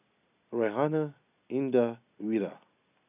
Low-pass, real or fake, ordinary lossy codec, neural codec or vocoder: 3.6 kHz; real; none; none